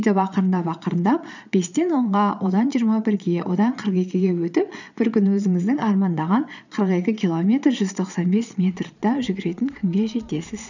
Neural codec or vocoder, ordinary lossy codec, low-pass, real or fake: vocoder, 22.05 kHz, 80 mel bands, Vocos; none; 7.2 kHz; fake